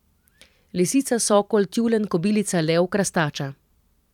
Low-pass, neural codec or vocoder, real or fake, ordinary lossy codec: 19.8 kHz; vocoder, 44.1 kHz, 128 mel bands every 512 samples, BigVGAN v2; fake; none